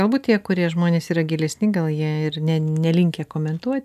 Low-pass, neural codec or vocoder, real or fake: 14.4 kHz; none; real